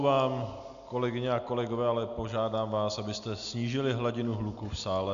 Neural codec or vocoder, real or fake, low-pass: none; real; 7.2 kHz